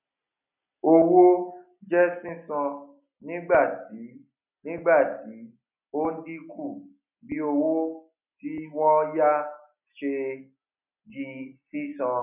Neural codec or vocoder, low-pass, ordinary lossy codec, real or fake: none; 3.6 kHz; none; real